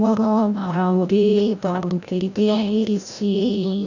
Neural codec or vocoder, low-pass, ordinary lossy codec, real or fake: codec, 16 kHz, 0.5 kbps, FreqCodec, larger model; 7.2 kHz; none; fake